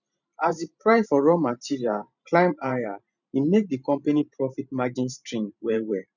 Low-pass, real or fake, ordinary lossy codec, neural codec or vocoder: 7.2 kHz; fake; none; vocoder, 44.1 kHz, 128 mel bands every 512 samples, BigVGAN v2